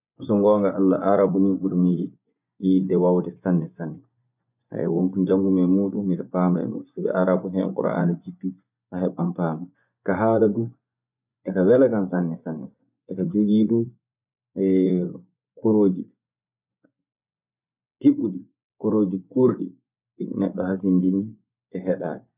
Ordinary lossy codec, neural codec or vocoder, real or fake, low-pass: none; vocoder, 24 kHz, 100 mel bands, Vocos; fake; 3.6 kHz